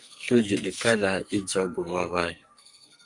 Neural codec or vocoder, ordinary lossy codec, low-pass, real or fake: codec, 44.1 kHz, 2.6 kbps, SNAC; Opus, 64 kbps; 10.8 kHz; fake